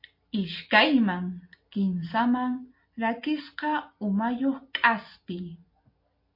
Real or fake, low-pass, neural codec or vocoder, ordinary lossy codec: real; 5.4 kHz; none; MP3, 32 kbps